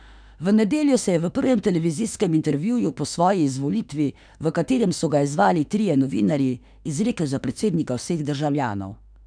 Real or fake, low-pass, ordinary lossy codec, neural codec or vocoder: fake; 9.9 kHz; none; autoencoder, 48 kHz, 32 numbers a frame, DAC-VAE, trained on Japanese speech